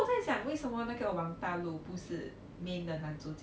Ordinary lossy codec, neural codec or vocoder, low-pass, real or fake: none; none; none; real